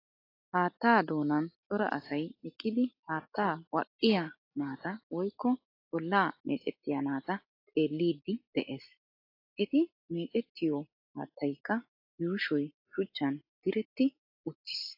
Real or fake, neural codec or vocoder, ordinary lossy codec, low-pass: real; none; AAC, 32 kbps; 5.4 kHz